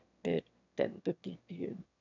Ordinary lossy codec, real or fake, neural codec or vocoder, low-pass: none; fake; autoencoder, 22.05 kHz, a latent of 192 numbers a frame, VITS, trained on one speaker; 7.2 kHz